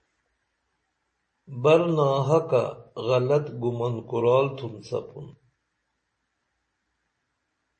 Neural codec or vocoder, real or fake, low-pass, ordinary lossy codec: none; real; 10.8 kHz; MP3, 32 kbps